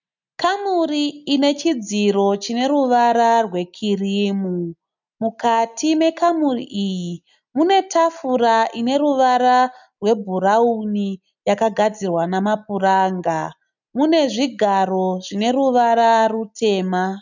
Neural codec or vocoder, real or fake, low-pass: none; real; 7.2 kHz